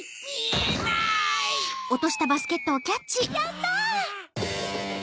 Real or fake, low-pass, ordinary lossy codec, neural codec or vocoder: real; none; none; none